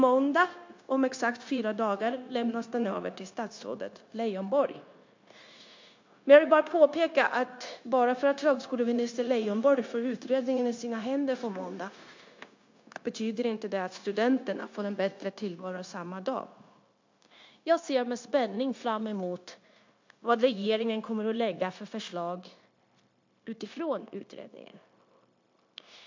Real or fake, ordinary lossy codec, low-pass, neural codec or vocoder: fake; MP3, 48 kbps; 7.2 kHz; codec, 16 kHz, 0.9 kbps, LongCat-Audio-Codec